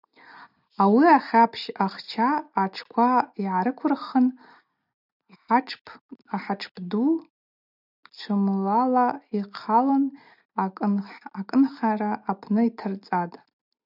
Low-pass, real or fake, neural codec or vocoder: 5.4 kHz; real; none